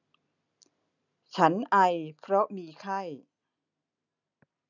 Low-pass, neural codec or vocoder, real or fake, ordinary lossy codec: 7.2 kHz; none; real; none